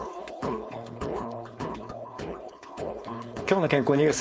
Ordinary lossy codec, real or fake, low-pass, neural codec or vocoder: none; fake; none; codec, 16 kHz, 4.8 kbps, FACodec